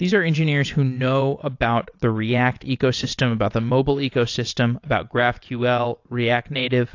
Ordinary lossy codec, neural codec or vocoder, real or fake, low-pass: AAC, 48 kbps; vocoder, 22.05 kHz, 80 mel bands, WaveNeXt; fake; 7.2 kHz